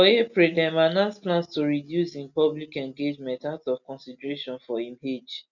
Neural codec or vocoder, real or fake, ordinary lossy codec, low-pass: none; real; none; 7.2 kHz